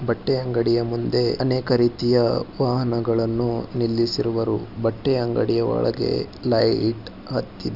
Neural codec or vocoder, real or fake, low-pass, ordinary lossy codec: none; real; 5.4 kHz; Opus, 64 kbps